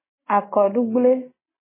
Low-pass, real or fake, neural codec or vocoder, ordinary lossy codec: 3.6 kHz; real; none; MP3, 16 kbps